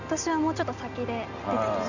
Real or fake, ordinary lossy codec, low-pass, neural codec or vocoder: real; none; 7.2 kHz; none